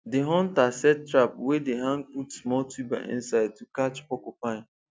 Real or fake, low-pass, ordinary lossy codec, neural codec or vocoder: real; none; none; none